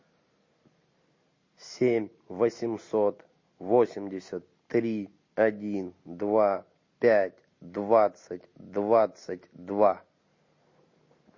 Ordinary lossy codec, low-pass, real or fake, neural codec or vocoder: MP3, 32 kbps; 7.2 kHz; real; none